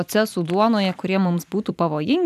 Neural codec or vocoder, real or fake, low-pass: vocoder, 44.1 kHz, 128 mel bands every 256 samples, BigVGAN v2; fake; 14.4 kHz